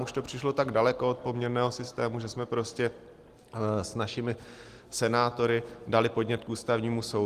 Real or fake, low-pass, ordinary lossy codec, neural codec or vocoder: real; 14.4 kHz; Opus, 24 kbps; none